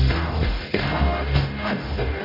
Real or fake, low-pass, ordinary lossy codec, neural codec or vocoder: fake; 5.4 kHz; none; codec, 44.1 kHz, 0.9 kbps, DAC